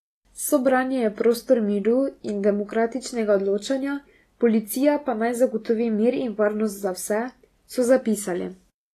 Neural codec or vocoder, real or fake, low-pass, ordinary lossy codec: none; real; 14.4 kHz; AAC, 48 kbps